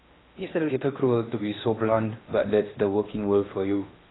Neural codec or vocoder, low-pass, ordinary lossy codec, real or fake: codec, 16 kHz in and 24 kHz out, 0.8 kbps, FocalCodec, streaming, 65536 codes; 7.2 kHz; AAC, 16 kbps; fake